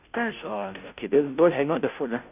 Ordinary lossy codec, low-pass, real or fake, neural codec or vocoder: none; 3.6 kHz; fake; codec, 16 kHz, 0.5 kbps, FunCodec, trained on Chinese and English, 25 frames a second